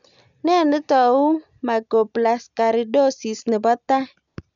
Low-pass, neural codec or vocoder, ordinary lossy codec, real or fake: 7.2 kHz; none; none; real